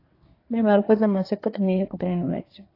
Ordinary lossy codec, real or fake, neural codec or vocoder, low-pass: AAC, 32 kbps; fake; codec, 24 kHz, 1 kbps, SNAC; 5.4 kHz